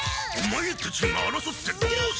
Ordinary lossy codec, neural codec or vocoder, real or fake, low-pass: none; none; real; none